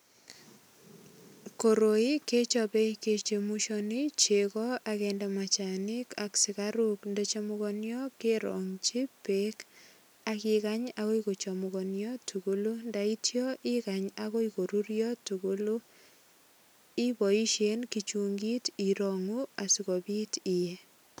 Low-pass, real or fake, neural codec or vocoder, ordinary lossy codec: none; real; none; none